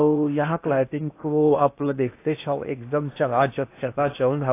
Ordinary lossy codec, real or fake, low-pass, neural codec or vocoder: AAC, 24 kbps; fake; 3.6 kHz; codec, 16 kHz in and 24 kHz out, 0.8 kbps, FocalCodec, streaming, 65536 codes